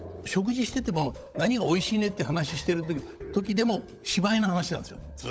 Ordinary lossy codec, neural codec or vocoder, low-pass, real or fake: none; codec, 16 kHz, 16 kbps, FunCodec, trained on LibriTTS, 50 frames a second; none; fake